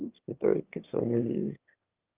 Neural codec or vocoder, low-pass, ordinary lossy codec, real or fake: autoencoder, 22.05 kHz, a latent of 192 numbers a frame, VITS, trained on one speaker; 3.6 kHz; Opus, 32 kbps; fake